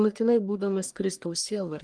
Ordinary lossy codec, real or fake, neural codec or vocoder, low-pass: Opus, 24 kbps; fake; codec, 44.1 kHz, 1.7 kbps, Pupu-Codec; 9.9 kHz